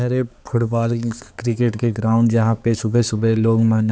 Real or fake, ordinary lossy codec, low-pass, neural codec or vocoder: fake; none; none; codec, 16 kHz, 4 kbps, X-Codec, HuBERT features, trained on balanced general audio